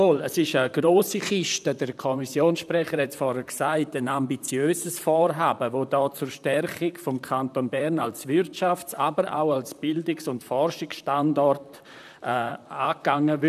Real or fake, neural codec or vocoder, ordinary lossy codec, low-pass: fake; vocoder, 44.1 kHz, 128 mel bands, Pupu-Vocoder; none; 14.4 kHz